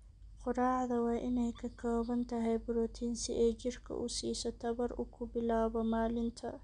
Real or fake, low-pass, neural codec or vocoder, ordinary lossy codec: real; 9.9 kHz; none; none